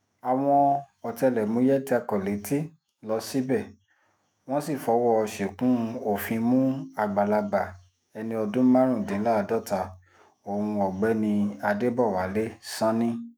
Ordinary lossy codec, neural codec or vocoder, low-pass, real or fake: none; autoencoder, 48 kHz, 128 numbers a frame, DAC-VAE, trained on Japanese speech; none; fake